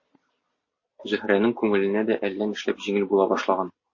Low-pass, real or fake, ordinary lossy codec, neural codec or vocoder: 7.2 kHz; real; MP3, 32 kbps; none